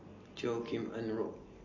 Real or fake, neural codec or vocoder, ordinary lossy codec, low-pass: real; none; MP3, 32 kbps; 7.2 kHz